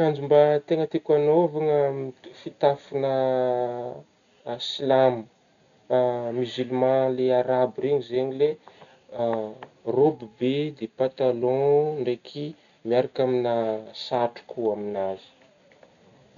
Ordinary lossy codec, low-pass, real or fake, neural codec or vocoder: none; 7.2 kHz; real; none